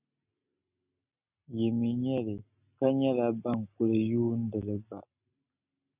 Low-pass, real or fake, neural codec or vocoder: 3.6 kHz; real; none